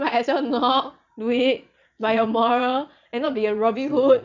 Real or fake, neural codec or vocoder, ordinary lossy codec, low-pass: fake; vocoder, 22.05 kHz, 80 mel bands, Vocos; none; 7.2 kHz